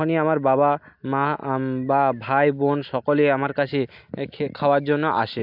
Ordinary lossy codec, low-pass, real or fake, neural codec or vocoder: AAC, 48 kbps; 5.4 kHz; real; none